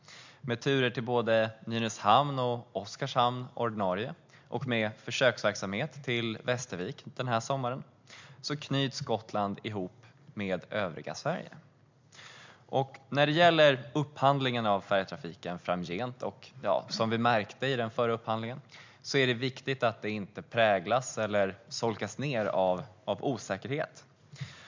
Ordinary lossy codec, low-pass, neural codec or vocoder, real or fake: MP3, 64 kbps; 7.2 kHz; none; real